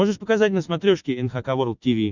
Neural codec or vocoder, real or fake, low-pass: none; real; 7.2 kHz